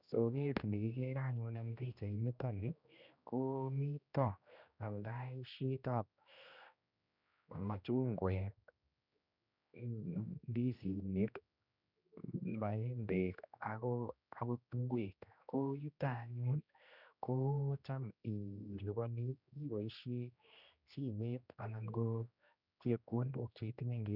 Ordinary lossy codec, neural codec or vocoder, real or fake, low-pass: none; codec, 16 kHz, 1 kbps, X-Codec, HuBERT features, trained on general audio; fake; 5.4 kHz